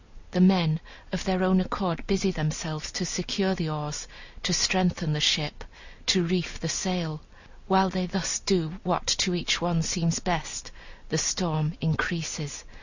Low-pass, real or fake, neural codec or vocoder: 7.2 kHz; real; none